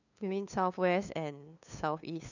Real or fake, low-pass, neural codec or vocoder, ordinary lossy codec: fake; 7.2 kHz; codec, 16 kHz, 2 kbps, FunCodec, trained on LibriTTS, 25 frames a second; none